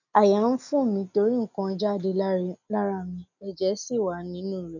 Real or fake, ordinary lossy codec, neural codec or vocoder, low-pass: real; none; none; 7.2 kHz